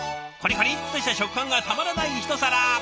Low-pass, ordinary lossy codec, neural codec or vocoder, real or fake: none; none; none; real